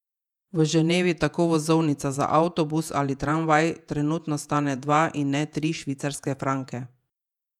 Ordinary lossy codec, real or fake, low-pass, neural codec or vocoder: none; fake; 19.8 kHz; vocoder, 48 kHz, 128 mel bands, Vocos